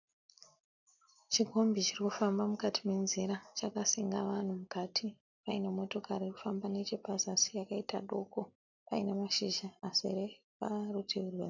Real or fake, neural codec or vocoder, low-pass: real; none; 7.2 kHz